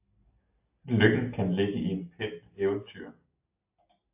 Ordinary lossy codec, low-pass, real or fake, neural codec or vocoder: AAC, 32 kbps; 3.6 kHz; real; none